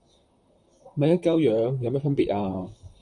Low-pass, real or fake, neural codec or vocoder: 10.8 kHz; fake; vocoder, 44.1 kHz, 128 mel bands, Pupu-Vocoder